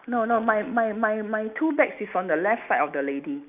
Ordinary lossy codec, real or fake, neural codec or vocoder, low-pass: none; real; none; 3.6 kHz